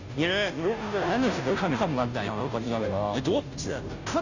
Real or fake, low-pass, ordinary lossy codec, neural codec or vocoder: fake; 7.2 kHz; Opus, 64 kbps; codec, 16 kHz, 0.5 kbps, FunCodec, trained on Chinese and English, 25 frames a second